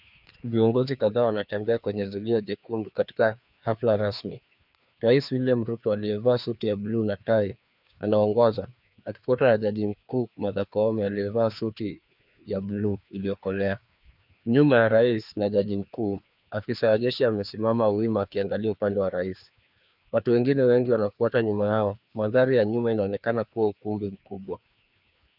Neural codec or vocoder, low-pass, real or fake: codec, 16 kHz, 2 kbps, FreqCodec, larger model; 5.4 kHz; fake